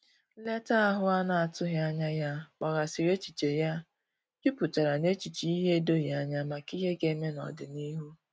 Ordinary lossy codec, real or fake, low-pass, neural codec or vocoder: none; real; none; none